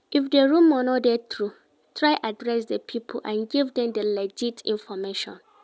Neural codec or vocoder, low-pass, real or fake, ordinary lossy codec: none; none; real; none